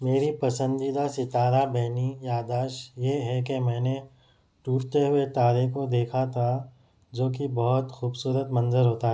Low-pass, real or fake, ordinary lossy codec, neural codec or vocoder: none; real; none; none